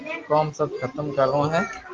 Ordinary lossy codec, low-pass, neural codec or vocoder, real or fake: Opus, 32 kbps; 7.2 kHz; none; real